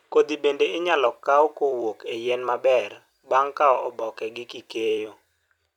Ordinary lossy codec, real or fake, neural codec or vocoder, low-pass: none; fake; vocoder, 44.1 kHz, 128 mel bands every 256 samples, BigVGAN v2; 19.8 kHz